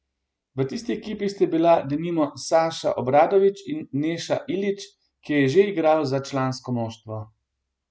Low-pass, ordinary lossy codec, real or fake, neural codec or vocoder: none; none; real; none